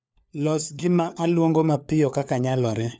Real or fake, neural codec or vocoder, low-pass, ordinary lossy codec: fake; codec, 16 kHz, 4 kbps, FunCodec, trained on LibriTTS, 50 frames a second; none; none